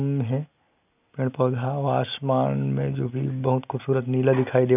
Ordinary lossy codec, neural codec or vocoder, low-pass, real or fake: none; none; 3.6 kHz; real